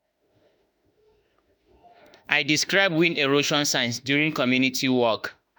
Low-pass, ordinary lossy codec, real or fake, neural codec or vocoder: none; none; fake; autoencoder, 48 kHz, 32 numbers a frame, DAC-VAE, trained on Japanese speech